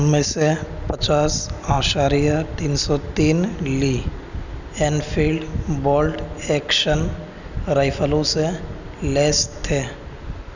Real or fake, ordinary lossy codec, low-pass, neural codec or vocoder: real; none; 7.2 kHz; none